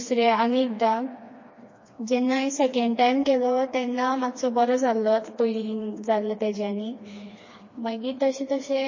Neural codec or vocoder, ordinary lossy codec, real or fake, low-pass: codec, 16 kHz, 2 kbps, FreqCodec, smaller model; MP3, 32 kbps; fake; 7.2 kHz